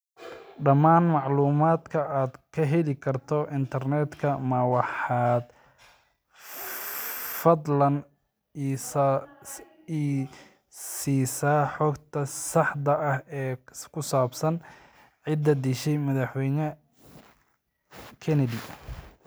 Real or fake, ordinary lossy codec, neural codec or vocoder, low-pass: real; none; none; none